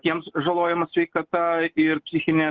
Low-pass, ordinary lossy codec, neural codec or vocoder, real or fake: 7.2 kHz; Opus, 16 kbps; none; real